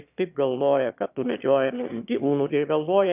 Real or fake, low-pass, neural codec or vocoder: fake; 3.6 kHz; autoencoder, 22.05 kHz, a latent of 192 numbers a frame, VITS, trained on one speaker